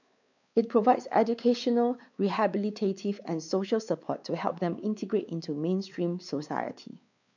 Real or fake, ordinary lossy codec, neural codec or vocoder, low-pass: fake; none; codec, 16 kHz, 4 kbps, X-Codec, WavLM features, trained on Multilingual LibriSpeech; 7.2 kHz